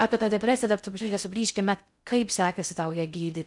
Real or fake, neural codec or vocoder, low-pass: fake; codec, 16 kHz in and 24 kHz out, 0.6 kbps, FocalCodec, streaming, 2048 codes; 10.8 kHz